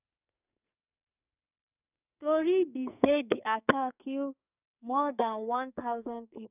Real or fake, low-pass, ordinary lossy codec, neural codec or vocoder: fake; 3.6 kHz; none; codec, 44.1 kHz, 2.6 kbps, SNAC